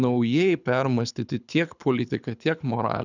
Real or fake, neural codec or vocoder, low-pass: fake; codec, 24 kHz, 6 kbps, HILCodec; 7.2 kHz